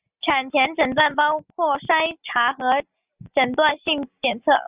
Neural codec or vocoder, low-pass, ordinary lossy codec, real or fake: none; 3.6 kHz; Opus, 64 kbps; real